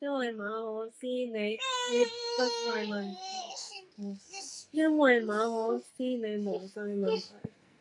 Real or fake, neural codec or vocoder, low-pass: fake; codec, 44.1 kHz, 2.6 kbps, SNAC; 10.8 kHz